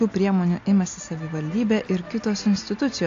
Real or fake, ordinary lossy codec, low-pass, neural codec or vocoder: real; AAC, 48 kbps; 7.2 kHz; none